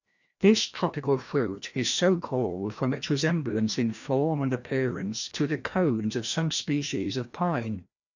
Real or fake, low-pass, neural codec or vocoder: fake; 7.2 kHz; codec, 16 kHz, 1 kbps, FreqCodec, larger model